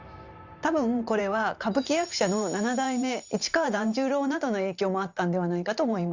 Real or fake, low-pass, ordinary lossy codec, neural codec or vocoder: fake; 7.2 kHz; Opus, 64 kbps; vocoder, 44.1 kHz, 128 mel bands every 512 samples, BigVGAN v2